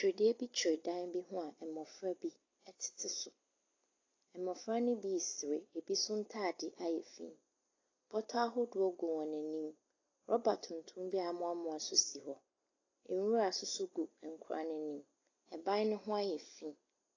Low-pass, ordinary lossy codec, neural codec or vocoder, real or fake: 7.2 kHz; AAC, 32 kbps; none; real